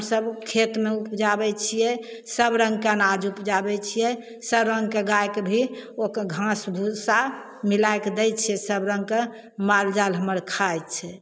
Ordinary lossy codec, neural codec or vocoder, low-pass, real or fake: none; none; none; real